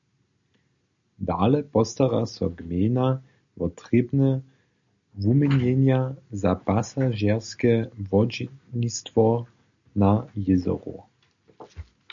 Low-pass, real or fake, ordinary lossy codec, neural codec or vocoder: 7.2 kHz; real; MP3, 64 kbps; none